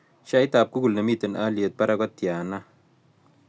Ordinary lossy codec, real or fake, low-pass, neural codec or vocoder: none; real; none; none